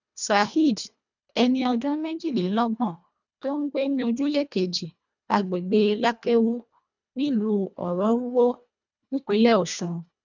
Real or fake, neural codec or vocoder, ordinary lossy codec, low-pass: fake; codec, 24 kHz, 1.5 kbps, HILCodec; none; 7.2 kHz